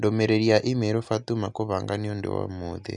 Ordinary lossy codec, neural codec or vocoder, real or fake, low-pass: none; none; real; 10.8 kHz